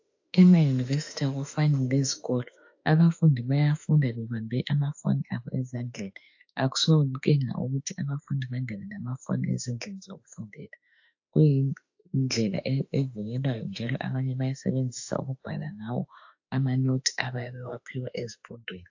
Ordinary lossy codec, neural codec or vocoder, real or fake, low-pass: AAC, 48 kbps; autoencoder, 48 kHz, 32 numbers a frame, DAC-VAE, trained on Japanese speech; fake; 7.2 kHz